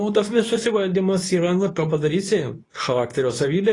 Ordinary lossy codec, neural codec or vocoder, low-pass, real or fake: AAC, 32 kbps; codec, 24 kHz, 0.9 kbps, WavTokenizer, medium speech release version 2; 10.8 kHz; fake